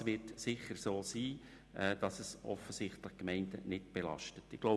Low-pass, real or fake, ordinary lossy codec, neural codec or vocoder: none; real; none; none